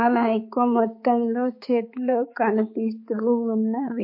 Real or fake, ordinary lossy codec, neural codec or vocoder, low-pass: fake; MP3, 32 kbps; codec, 16 kHz, 4 kbps, X-Codec, HuBERT features, trained on balanced general audio; 5.4 kHz